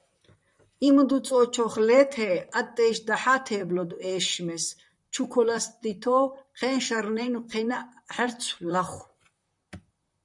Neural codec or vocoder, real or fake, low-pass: vocoder, 44.1 kHz, 128 mel bands, Pupu-Vocoder; fake; 10.8 kHz